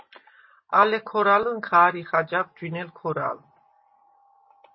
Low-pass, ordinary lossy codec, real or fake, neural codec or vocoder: 7.2 kHz; MP3, 24 kbps; real; none